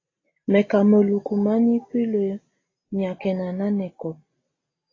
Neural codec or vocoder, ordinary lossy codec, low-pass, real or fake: none; AAC, 32 kbps; 7.2 kHz; real